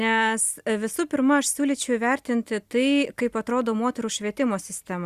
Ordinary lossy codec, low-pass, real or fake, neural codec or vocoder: Opus, 64 kbps; 14.4 kHz; real; none